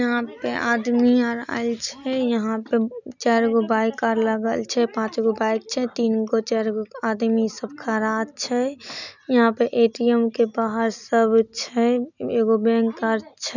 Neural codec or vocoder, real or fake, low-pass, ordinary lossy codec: none; real; 7.2 kHz; none